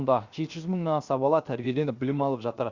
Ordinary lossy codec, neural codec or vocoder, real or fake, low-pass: Opus, 64 kbps; codec, 16 kHz, 0.7 kbps, FocalCodec; fake; 7.2 kHz